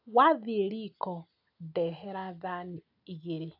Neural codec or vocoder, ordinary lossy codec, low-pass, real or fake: none; AAC, 48 kbps; 5.4 kHz; real